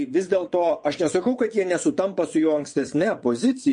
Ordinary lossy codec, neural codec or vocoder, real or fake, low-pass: MP3, 48 kbps; vocoder, 22.05 kHz, 80 mel bands, WaveNeXt; fake; 9.9 kHz